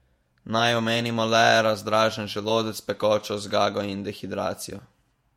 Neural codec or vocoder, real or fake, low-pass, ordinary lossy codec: vocoder, 48 kHz, 128 mel bands, Vocos; fake; 19.8 kHz; MP3, 64 kbps